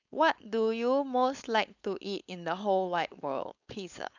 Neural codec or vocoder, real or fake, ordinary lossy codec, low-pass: codec, 16 kHz, 4.8 kbps, FACodec; fake; none; 7.2 kHz